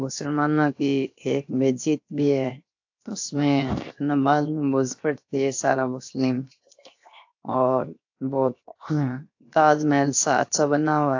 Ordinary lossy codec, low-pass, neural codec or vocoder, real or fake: AAC, 48 kbps; 7.2 kHz; codec, 16 kHz, 0.7 kbps, FocalCodec; fake